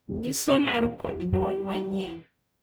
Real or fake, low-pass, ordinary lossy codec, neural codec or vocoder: fake; none; none; codec, 44.1 kHz, 0.9 kbps, DAC